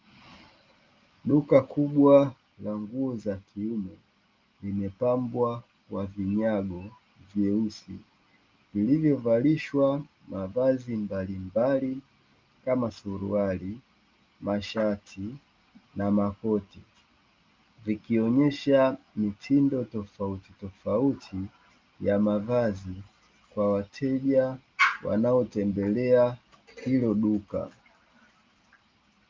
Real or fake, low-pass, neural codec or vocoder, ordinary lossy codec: real; 7.2 kHz; none; Opus, 24 kbps